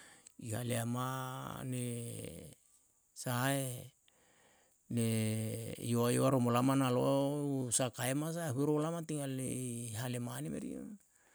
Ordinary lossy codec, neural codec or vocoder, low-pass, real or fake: none; none; none; real